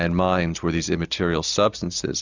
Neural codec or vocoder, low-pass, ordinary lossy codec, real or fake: none; 7.2 kHz; Opus, 64 kbps; real